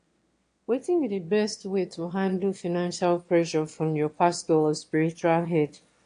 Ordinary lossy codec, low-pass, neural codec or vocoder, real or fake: AAC, 48 kbps; 9.9 kHz; autoencoder, 22.05 kHz, a latent of 192 numbers a frame, VITS, trained on one speaker; fake